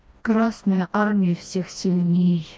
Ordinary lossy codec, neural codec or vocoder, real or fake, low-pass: none; codec, 16 kHz, 1 kbps, FreqCodec, smaller model; fake; none